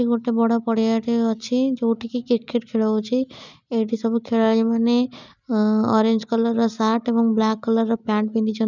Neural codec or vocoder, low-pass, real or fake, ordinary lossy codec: none; 7.2 kHz; real; none